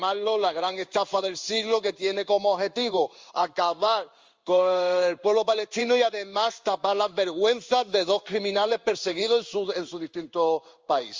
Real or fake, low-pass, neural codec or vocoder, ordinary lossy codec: fake; 7.2 kHz; codec, 16 kHz in and 24 kHz out, 1 kbps, XY-Tokenizer; Opus, 32 kbps